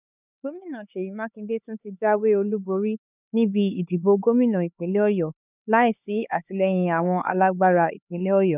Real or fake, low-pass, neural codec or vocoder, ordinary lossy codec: fake; 3.6 kHz; codec, 16 kHz, 4 kbps, X-Codec, HuBERT features, trained on LibriSpeech; none